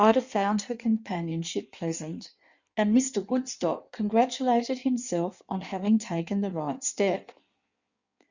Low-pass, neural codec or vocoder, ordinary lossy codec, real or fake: 7.2 kHz; codec, 16 kHz in and 24 kHz out, 1.1 kbps, FireRedTTS-2 codec; Opus, 64 kbps; fake